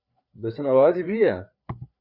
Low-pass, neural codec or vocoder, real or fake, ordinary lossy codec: 5.4 kHz; codec, 16 kHz, 4 kbps, FreqCodec, larger model; fake; Opus, 64 kbps